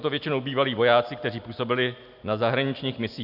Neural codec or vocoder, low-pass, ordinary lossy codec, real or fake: none; 5.4 kHz; AAC, 48 kbps; real